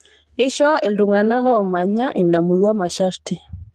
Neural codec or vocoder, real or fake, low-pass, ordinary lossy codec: codec, 32 kHz, 1.9 kbps, SNAC; fake; 14.4 kHz; Opus, 24 kbps